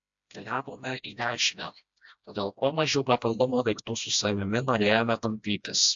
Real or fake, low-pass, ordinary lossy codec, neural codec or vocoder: fake; 7.2 kHz; AAC, 64 kbps; codec, 16 kHz, 1 kbps, FreqCodec, smaller model